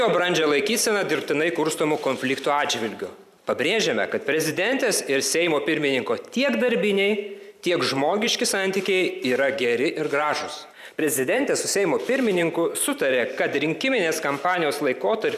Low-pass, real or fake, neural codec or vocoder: 14.4 kHz; fake; vocoder, 44.1 kHz, 128 mel bands every 512 samples, BigVGAN v2